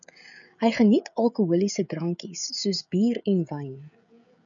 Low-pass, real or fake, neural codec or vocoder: 7.2 kHz; fake; codec, 16 kHz, 16 kbps, FreqCodec, smaller model